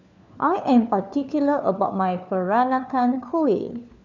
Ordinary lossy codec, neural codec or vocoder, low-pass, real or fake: none; codec, 16 kHz, 4 kbps, FunCodec, trained on LibriTTS, 50 frames a second; 7.2 kHz; fake